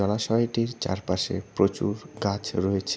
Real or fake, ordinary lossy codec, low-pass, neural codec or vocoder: real; none; none; none